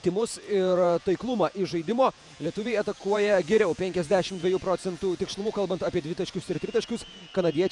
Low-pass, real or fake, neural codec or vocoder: 10.8 kHz; fake; vocoder, 48 kHz, 128 mel bands, Vocos